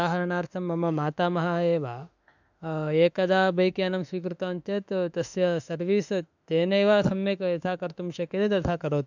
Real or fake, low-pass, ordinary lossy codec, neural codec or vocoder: fake; 7.2 kHz; none; autoencoder, 48 kHz, 32 numbers a frame, DAC-VAE, trained on Japanese speech